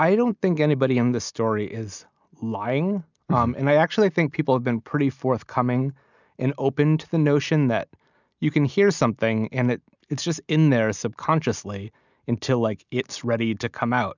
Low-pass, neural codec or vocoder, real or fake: 7.2 kHz; none; real